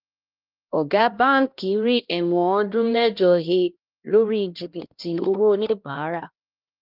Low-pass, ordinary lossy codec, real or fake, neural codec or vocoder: 5.4 kHz; Opus, 32 kbps; fake; codec, 16 kHz, 1 kbps, X-Codec, HuBERT features, trained on LibriSpeech